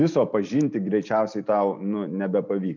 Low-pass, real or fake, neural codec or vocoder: 7.2 kHz; real; none